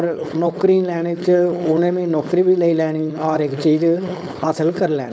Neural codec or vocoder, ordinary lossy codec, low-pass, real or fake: codec, 16 kHz, 4.8 kbps, FACodec; none; none; fake